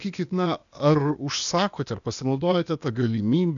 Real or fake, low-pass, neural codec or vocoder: fake; 7.2 kHz; codec, 16 kHz, 0.8 kbps, ZipCodec